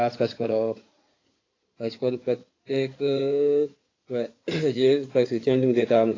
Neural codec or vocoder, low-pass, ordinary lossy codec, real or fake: codec, 16 kHz in and 24 kHz out, 2.2 kbps, FireRedTTS-2 codec; 7.2 kHz; AAC, 32 kbps; fake